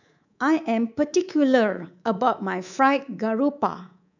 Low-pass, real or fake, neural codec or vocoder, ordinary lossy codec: 7.2 kHz; fake; codec, 24 kHz, 3.1 kbps, DualCodec; none